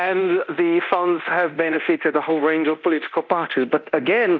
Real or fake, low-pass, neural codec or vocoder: fake; 7.2 kHz; codec, 24 kHz, 0.9 kbps, DualCodec